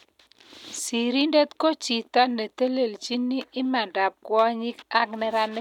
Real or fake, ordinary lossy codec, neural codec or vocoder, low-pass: real; none; none; 19.8 kHz